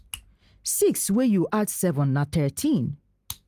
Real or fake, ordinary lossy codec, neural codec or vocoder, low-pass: real; Opus, 32 kbps; none; 14.4 kHz